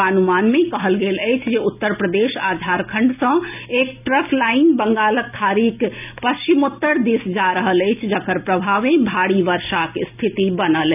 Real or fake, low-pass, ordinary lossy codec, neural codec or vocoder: real; 3.6 kHz; none; none